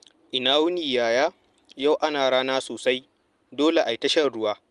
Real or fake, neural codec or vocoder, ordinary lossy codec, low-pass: real; none; Opus, 32 kbps; 10.8 kHz